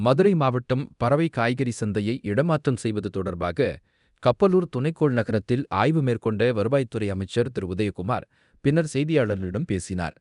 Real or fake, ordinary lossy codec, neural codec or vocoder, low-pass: fake; none; codec, 24 kHz, 0.9 kbps, DualCodec; 10.8 kHz